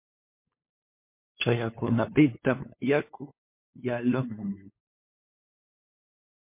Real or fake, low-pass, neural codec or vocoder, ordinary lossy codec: fake; 3.6 kHz; codec, 16 kHz, 8 kbps, FunCodec, trained on LibriTTS, 25 frames a second; MP3, 24 kbps